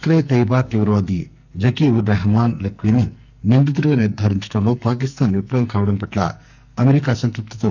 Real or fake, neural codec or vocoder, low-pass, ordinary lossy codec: fake; codec, 44.1 kHz, 2.6 kbps, SNAC; 7.2 kHz; none